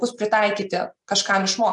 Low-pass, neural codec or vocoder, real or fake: 10.8 kHz; none; real